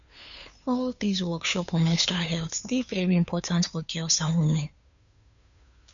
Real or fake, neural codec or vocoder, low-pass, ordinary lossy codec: fake; codec, 16 kHz, 2 kbps, FunCodec, trained on Chinese and English, 25 frames a second; 7.2 kHz; none